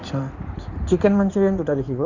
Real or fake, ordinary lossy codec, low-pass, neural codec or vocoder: fake; none; 7.2 kHz; codec, 16 kHz in and 24 kHz out, 2.2 kbps, FireRedTTS-2 codec